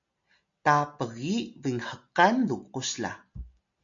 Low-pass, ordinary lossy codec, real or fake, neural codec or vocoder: 7.2 kHz; MP3, 64 kbps; real; none